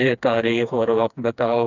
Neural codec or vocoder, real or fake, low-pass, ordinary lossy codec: codec, 16 kHz, 2 kbps, FreqCodec, smaller model; fake; 7.2 kHz; none